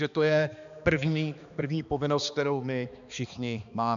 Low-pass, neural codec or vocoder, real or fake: 7.2 kHz; codec, 16 kHz, 2 kbps, X-Codec, HuBERT features, trained on balanced general audio; fake